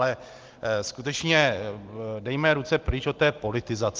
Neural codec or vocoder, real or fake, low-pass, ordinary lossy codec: none; real; 7.2 kHz; Opus, 32 kbps